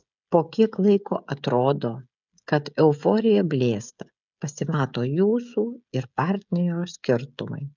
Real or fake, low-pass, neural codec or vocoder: fake; 7.2 kHz; codec, 16 kHz, 16 kbps, FreqCodec, smaller model